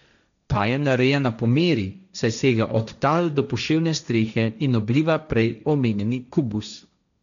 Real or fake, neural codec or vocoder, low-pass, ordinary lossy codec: fake; codec, 16 kHz, 1.1 kbps, Voila-Tokenizer; 7.2 kHz; none